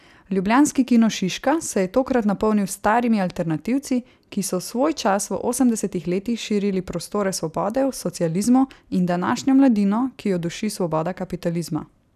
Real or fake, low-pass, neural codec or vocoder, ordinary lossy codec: real; 14.4 kHz; none; none